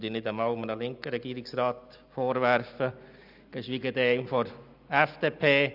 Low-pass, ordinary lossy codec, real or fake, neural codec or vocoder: 5.4 kHz; none; real; none